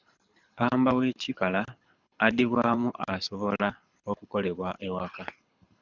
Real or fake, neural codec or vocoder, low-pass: fake; codec, 24 kHz, 6 kbps, HILCodec; 7.2 kHz